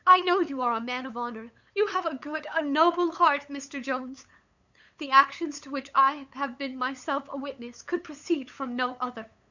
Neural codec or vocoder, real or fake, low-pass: codec, 16 kHz, 8 kbps, FunCodec, trained on LibriTTS, 25 frames a second; fake; 7.2 kHz